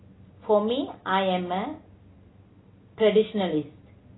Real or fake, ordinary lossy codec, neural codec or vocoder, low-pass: real; AAC, 16 kbps; none; 7.2 kHz